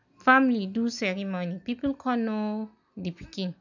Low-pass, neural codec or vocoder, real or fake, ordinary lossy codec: 7.2 kHz; none; real; none